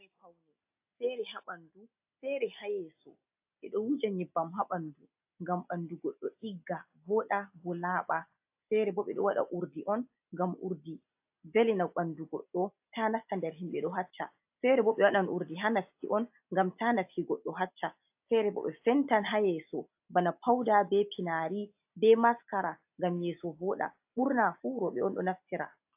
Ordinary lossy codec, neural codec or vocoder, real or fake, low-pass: AAC, 32 kbps; none; real; 3.6 kHz